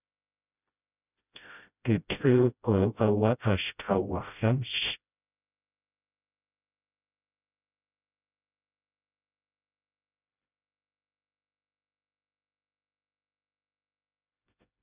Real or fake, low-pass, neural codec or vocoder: fake; 3.6 kHz; codec, 16 kHz, 0.5 kbps, FreqCodec, smaller model